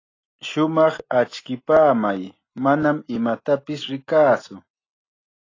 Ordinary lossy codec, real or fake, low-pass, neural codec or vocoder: AAC, 32 kbps; real; 7.2 kHz; none